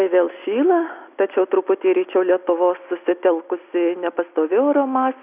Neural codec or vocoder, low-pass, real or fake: none; 3.6 kHz; real